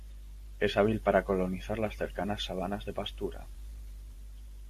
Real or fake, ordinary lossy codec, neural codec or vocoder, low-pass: fake; AAC, 64 kbps; vocoder, 44.1 kHz, 128 mel bands every 512 samples, BigVGAN v2; 14.4 kHz